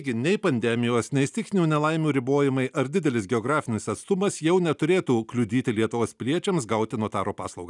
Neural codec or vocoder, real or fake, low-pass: none; real; 10.8 kHz